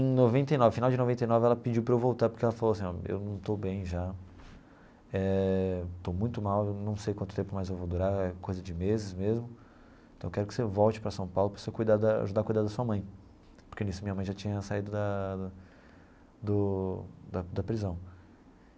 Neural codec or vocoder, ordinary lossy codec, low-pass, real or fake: none; none; none; real